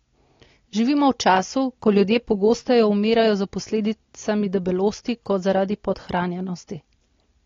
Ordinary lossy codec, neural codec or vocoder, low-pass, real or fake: AAC, 32 kbps; none; 7.2 kHz; real